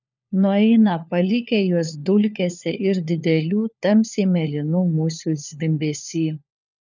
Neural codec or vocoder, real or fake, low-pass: codec, 16 kHz, 4 kbps, FunCodec, trained on LibriTTS, 50 frames a second; fake; 7.2 kHz